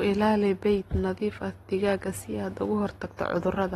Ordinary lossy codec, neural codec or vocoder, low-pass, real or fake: AAC, 32 kbps; none; 19.8 kHz; real